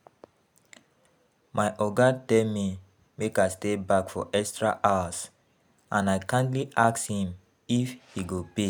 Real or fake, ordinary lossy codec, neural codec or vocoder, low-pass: real; none; none; none